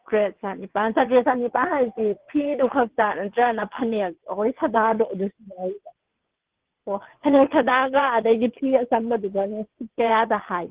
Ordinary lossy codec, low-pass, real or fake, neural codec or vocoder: Opus, 16 kbps; 3.6 kHz; fake; vocoder, 22.05 kHz, 80 mel bands, WaveNeXt